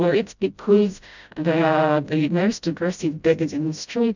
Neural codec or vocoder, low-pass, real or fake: codec, 16 kHz, 0.5 kbps, FreqCodec, smaller model; 7.2 kHz; fake